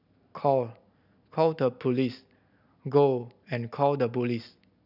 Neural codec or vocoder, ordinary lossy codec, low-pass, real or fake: none; none; 5.4 kHz; real